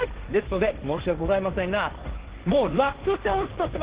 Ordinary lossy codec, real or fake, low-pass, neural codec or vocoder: Opus, 16 kbps; fake; 3.6 kHz; codec, 16 kHz, 1.1 kbps, Voila-Tokenizer